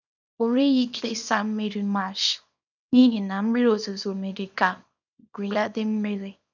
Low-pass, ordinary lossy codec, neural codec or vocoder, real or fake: 7.2 kHz; none; codec, 24 kHz, 0.9 kbps, WavTokenizer, small release; fake